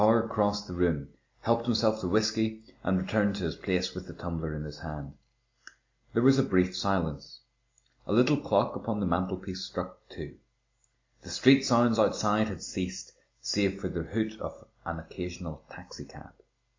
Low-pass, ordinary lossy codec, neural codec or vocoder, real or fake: 7.2 kHz; MP3, 64 kbps; none; real